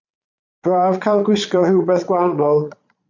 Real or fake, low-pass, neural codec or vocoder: fake; 7.2 kHz; vocoder, 22.05 kHz, 80 mel bands, Vocos